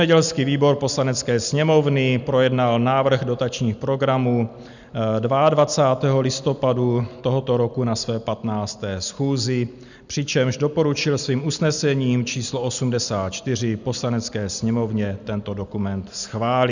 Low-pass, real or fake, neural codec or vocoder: 7.2 kHz; real; none